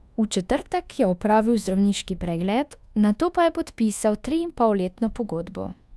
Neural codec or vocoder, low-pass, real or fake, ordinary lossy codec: codec, 24 kHz, 1.2 kbps, DualCodec; none; fake; none